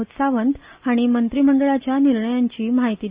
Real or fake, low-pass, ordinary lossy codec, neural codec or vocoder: real; 3.6 kHz; Opus, 64 kbps; none